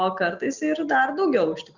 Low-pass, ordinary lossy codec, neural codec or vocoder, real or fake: 7.2 kHz; Opus, 64 kbps; none; real